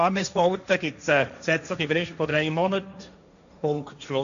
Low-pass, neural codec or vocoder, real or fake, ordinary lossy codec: 7.2 kHz; codec, 16 kHz, 1.1 kbps, Voila-Tokenizer; fake; none